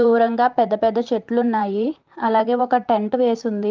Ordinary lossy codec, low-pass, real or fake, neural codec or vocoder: Opus, 32 kbps; 7.2 kHz; fake; vocoder, 44.1 kHz, 128 mel bands, Pupu-Vocoder